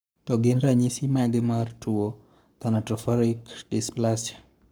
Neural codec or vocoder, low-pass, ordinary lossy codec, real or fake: codec, 44.1 kHz, 7.8 kbps, Pupu-Codec; none; none; fake